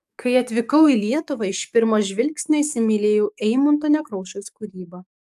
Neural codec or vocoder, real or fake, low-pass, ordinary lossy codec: codec, 44.1 kHz, 7.8 kbps, DAC; fake; 14.4 kHz; AAC, 96 kbps